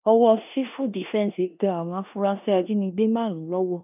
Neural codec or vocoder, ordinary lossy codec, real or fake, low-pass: codec, 16 kHz in and 24 kHz out, 0.9 kbps, LongCat-Audio-Codec, four codebook decoder; none; fake; 3.6 kHz